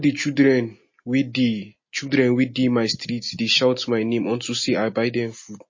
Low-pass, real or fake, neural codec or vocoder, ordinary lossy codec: 7.2 kHz; real; none; MP3, 32 kbps